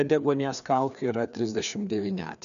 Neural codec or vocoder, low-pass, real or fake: codec, 16 kHz, 2 kbps, FreqCodec, larger model; 7.2 kHz; fake